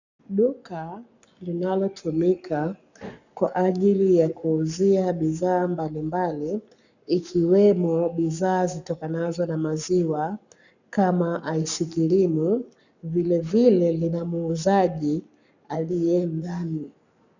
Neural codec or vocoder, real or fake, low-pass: codec, 44.1 kHz, 7.8 kbps, Pupu-Codec; fake; 7.2 kHz